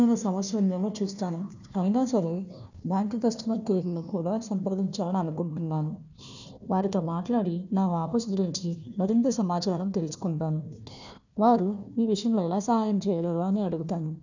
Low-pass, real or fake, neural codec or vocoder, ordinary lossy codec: 7.2 kHz; fake; codec, 16 kHz, 1 kbps, FunCodec, trained on Chinese and English, 50 frames a second; none